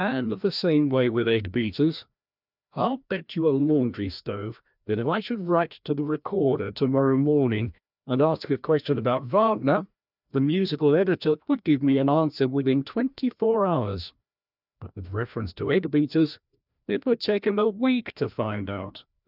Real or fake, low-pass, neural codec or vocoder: fake; 5.4 kHz; codec, 16 kHz, 1 kbps, FreqCodec, larger model